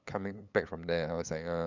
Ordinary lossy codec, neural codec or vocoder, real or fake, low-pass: none; codec, 16 kHz, 8 kbps, FunCodec, trained on LibriTTS, 25 frames a second; fake; 7.2 kHz